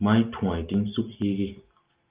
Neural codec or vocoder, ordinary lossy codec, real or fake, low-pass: none; Opus, 24 kbps; real; 3.6 kHz